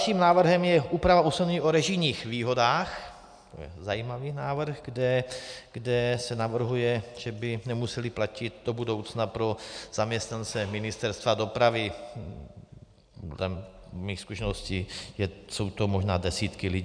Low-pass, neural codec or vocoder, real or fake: 9.9 kHz; none; real